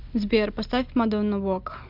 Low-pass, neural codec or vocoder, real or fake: 5.4 kHz; none; real